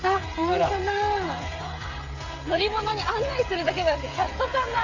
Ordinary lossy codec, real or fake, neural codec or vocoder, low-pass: AAC, 48 kbps; fake; codec, 16 kHz, 16 kbps, FreqCodec, smaller model; 7.2 kHz